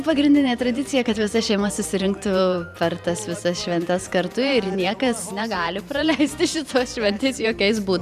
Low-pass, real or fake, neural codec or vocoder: 14.4 kHz; fake; vocoder, 44.1 kHz, 128 mel bands every 512 samples, BigVGAN v2